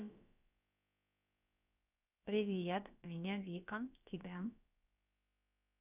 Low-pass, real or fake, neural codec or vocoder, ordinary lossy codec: 3.6 kHz; fake; codec, 16 kHz, about 1 kbps, DyCAST, with the encoder's durations; none